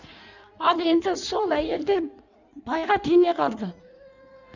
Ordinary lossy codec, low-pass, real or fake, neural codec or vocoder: none; 7.2 kHz; fake; codec, 16 kHz in and 24 kHz out, 1.1 kbps, FireRedTTS-2 codec